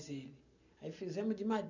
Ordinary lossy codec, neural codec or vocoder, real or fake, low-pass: none; none; real; 7.2 kHz